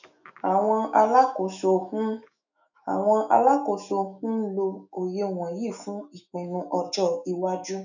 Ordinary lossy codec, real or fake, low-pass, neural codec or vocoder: none; fake; 7.2 kHz; autoencoder, 48 kHz, 128 numbers a frame, DAC-VAE, trained on Japanese speech